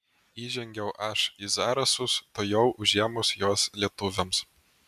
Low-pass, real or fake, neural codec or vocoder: 14.4 kHz; real; none